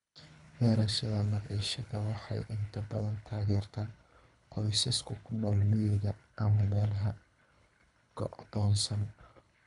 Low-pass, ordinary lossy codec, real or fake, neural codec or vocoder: 10.8 kHz; none; fake; codec, 24 kHz, 3 kbps, HILCodec